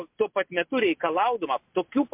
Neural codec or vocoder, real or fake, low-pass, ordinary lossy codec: none; real; 3.6 kHz; MP3, 32 kbps